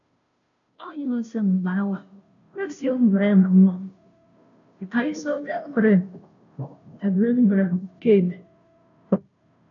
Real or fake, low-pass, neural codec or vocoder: fake; 7.2 kHz; codec, 16 kHz, 0.5 kbps, FunCodec, trained on Chinese and English, 25 frames a second